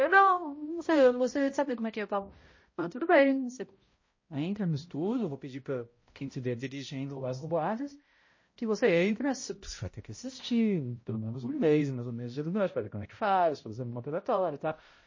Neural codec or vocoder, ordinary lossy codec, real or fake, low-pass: codec, 16 kHz, 0.5 kbps, X-Codec, HuBERT features, trained on balanced general audio; MP3, 32 kbps; fake; 7.2 kHz